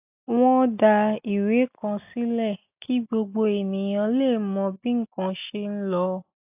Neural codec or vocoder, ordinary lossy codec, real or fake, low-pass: none; AAC, 32 kbps; real; 3.6 kHz